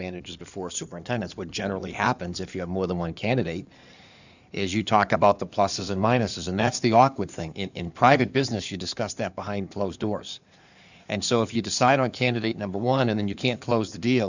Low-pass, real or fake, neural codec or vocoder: 7.2 kHz; fake; codec, 16 kHz in and 24 kHz out, 2.2 kbps, FireRedTTS-2 codec